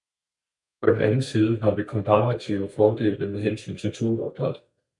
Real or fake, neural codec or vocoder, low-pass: fake; codec, 32 kHz, 1.9 kbps, SNAC; 10.8 kHz